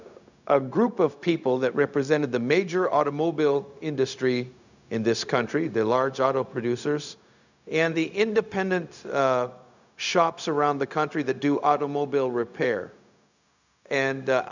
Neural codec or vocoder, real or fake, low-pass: codec, 16 kHz, 0.4 kbps, LongCat-Audio-Codec; fake; 7.2 kHz